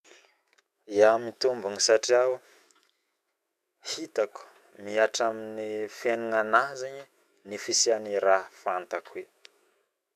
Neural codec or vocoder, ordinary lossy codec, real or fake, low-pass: autoencoder, 48 kHz, 128 numbers a frame, DAC-VAE, trained on Japanese speech; none; fake; 14.4 kHz